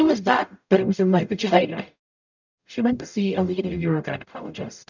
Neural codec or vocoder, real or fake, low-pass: codec, 44.1 kHz, 0.9 kbps, DAC; fake; 7.2 kHz